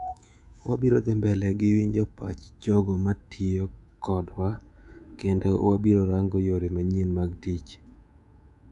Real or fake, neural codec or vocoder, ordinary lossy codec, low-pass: fake; codec, 24 kHz, 3.1 kbps, DualCodec; none; 10.8 kHz